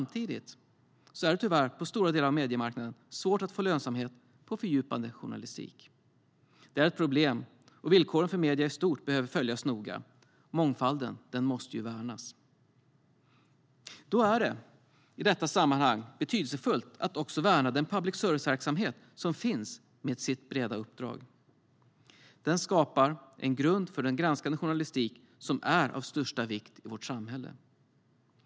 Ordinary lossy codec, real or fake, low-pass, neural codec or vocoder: none; real; none; none